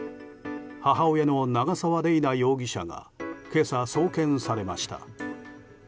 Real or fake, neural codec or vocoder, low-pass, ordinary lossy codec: real; none; none; none